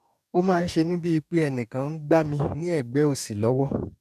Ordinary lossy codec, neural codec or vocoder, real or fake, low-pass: none; codec, 44.1 kHz, 2.6 kbps, DAC; fake; 14.4 kHz